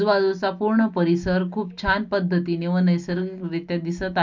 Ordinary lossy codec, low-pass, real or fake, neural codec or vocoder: MP3, 64 kbps; 7.2 kHz; real; none